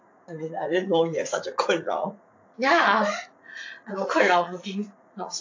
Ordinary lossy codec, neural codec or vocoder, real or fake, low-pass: AAC, 48 kbps; vocoder, 44.1 kHz, 80 mel bands, Vocos; fake; 7.2 kHz